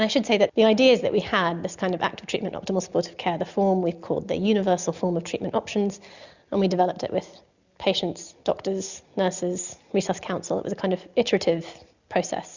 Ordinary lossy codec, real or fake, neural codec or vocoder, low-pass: Opus, 64 kbps; real; none; 7.2 kHz